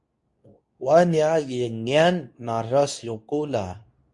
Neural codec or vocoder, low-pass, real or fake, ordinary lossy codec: codec, 24 kHz, 0.9 kbps, WavTokenizer, medium speech release version 2; 10.8 kHz; fake; MP3, 48 kbps